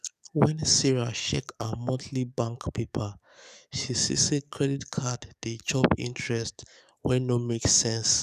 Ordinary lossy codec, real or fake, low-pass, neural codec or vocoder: none; fake; 14.4 kHz; codec, 44.1 kHz, 7.8 kbps, DAC